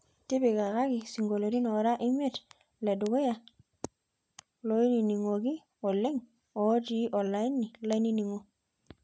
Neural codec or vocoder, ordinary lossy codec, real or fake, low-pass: none; none; real; none